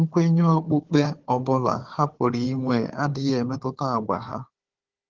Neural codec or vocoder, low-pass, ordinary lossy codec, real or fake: codec, 16 kHz, 4 kbps, FunCodec, trained on Chinese and English, 50 frames a second; 7.2 kHz; Opus, 16 kbps; fake